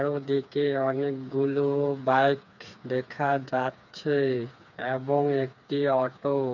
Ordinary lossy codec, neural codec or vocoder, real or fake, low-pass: none; codec, 16 kHz, 4 kbps, FreqCodec, smaller model; fake; 7.2 kHz